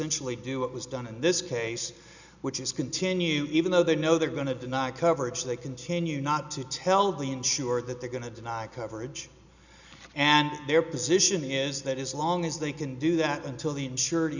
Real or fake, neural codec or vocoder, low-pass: real; none; 7.2 kHz